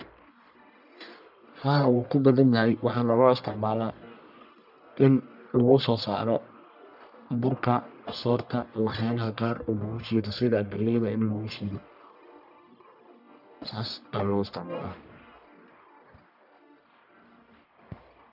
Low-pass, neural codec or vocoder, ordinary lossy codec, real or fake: 5.4 kHz; codec, 44.1 kHz, 1.7 kbps, Pupu-Codec; none; fake